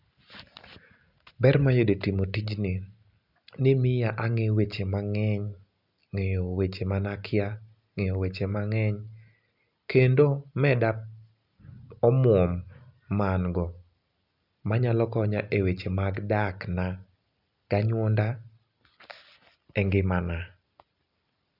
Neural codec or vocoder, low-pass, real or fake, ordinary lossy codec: none; 5.4 kHz; real; none